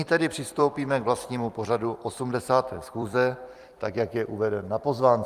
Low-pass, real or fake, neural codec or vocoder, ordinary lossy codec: 14.4 kHz; fake; vocoder, 44.1 kHz, 128 mel bands every 256 samples, BigVGAN v2; Opus, 32 kbps